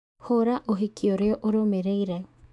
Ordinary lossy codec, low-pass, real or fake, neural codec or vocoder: AAC, 64 kbps; 10.8 kHz; fake; autoencoder, 48 kHz, 128 numbers a frame, DAC-VAE, trained on Japanese speech